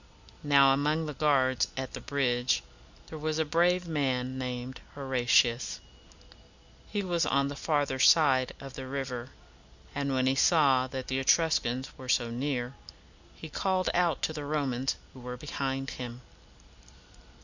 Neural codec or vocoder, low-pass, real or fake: none; 7.2 kHz; real